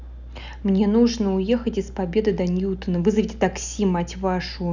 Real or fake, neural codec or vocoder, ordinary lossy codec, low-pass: real; none; none; 7.2 kHz